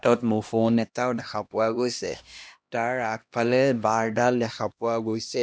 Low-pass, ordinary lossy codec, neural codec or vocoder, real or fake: none; none; codec, 16 kHz, 1 kbps, X-Codec, HuBERT features, trained on LibriSpeech; fake